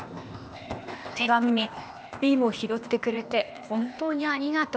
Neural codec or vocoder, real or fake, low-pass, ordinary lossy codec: codec, 16 kHz, 0.8 kbps, ZipCodec; fake; none; none